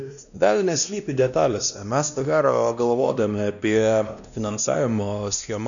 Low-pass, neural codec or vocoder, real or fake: 7.2 kHz; codec, 16 kHz, 1 kbps, X-Codec, WavLM features, trained on Multilingual LibriSpeech; fake